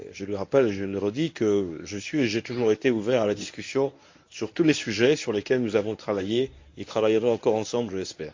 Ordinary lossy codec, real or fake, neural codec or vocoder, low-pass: MP3, 48 kbps; fake; codec, 24 kHz, 0.9 kbps, WavTokenizer, medium speech release version 1; 7.2 kHz